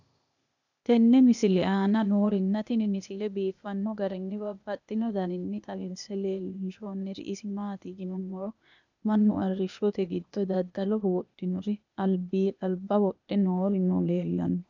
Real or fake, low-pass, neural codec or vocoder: fake; 7.2 kHz; codec, 16 kHz, 0.8 kbps, ZipCodec